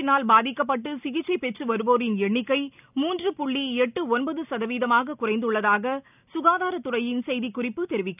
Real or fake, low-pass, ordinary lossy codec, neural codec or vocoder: real; 3.6 kHz; none; none